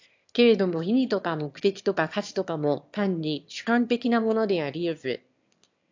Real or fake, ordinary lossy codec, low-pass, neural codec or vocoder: fake; AAC, 48 kbps; 7.2 kHz; autoencoder, 22.05 kHz, a latent of 192 numbers a frame, VITS, trained on one speaker